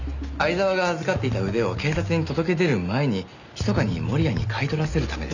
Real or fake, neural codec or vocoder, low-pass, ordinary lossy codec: real; none; 7.2 kHz; none